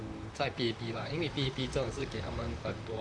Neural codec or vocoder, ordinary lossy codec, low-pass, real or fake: vocoder, 44.1 kHz, 128 mel bands, Pupu-Vocoder; MP3, 96 kbps; 9.9 kHz; fake